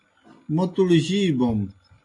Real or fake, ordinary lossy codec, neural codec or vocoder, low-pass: real; AAC, 48 kbps; none; 10.8 kHz